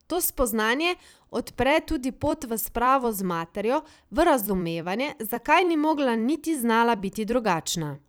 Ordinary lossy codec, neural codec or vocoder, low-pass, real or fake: none; vocoder, 44.1 kHz, 128 mel bands every 256 samples, BigVGAN v2; none; fake